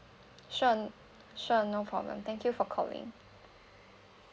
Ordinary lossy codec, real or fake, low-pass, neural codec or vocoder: none; real; none; none